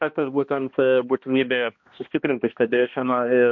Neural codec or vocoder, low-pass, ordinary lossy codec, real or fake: codec, 16 kHz, 1 kbps, X-Codec, HuBERT features, trained on balanced general audio; 7.2 kHz; MP3, 48 kbps; fake